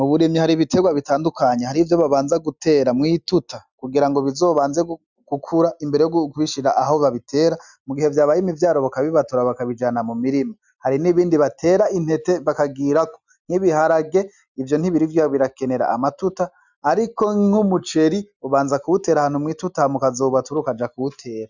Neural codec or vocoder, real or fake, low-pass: none; real; 7.2 kHz